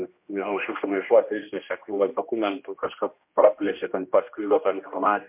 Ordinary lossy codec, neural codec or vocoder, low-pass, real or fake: MP3, 32 kbps; codec, 16 kHz, 1 kbps, X-Codec, HuBERT features, trained on general audio; 3.6 kHz; fake